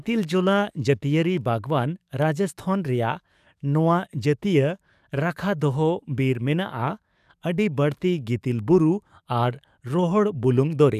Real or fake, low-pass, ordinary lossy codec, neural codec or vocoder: fake; 14.4 kHz; none; codec, 44.1 kHz, 7.8 kbps, DAC